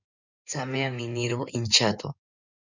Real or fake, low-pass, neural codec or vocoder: fake; 7.2 kHz; codec, 16 kHz in and 24 kHz out, 2.2 kbps, FireRedTTS-2 codec